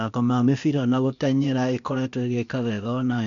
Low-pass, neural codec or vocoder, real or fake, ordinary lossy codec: 7.2 kHz; codec, 16 kHz, 0.8 kbps, ZipCodec; fake; none